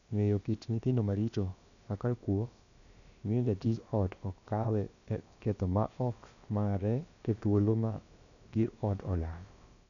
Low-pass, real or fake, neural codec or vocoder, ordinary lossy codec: 7.2 kHz; fake; codec, 16 kHz, about 1 kbps, DyCAST, with the encoder's durations; none